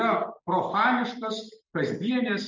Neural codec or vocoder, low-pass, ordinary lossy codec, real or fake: none; 7.2 kHz; MP3, 64 kbps; real